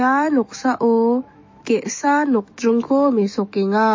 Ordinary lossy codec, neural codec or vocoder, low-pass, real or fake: MP3, 32 kbps; autoencoder, 48 kHz, 128 numbers a frame, DAC-VAE, trained on Japanese speech; 7.2 kHz; fake